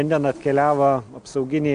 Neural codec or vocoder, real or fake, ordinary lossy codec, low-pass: none; real; MP3, 64 kbps; 9.9 kHz